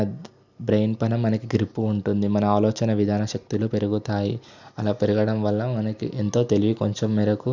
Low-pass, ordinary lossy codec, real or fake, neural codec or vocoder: 7.2 kHz; none; real; none